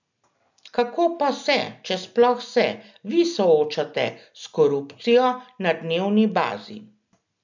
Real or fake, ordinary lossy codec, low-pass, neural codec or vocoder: real; none; 7.2 kHz; none